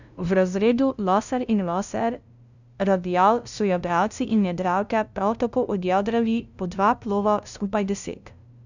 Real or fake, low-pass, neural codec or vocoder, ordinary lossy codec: fake; 7.2 kHz; codec, 16 kHz, 0.5 kbps, FunCodec, trained on LibriTTS, 25 frames a second; none